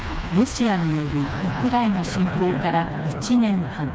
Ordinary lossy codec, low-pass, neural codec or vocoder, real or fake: none; none; codec, 16 kHz, 2 kbps, FreqCodec, smaller model; fake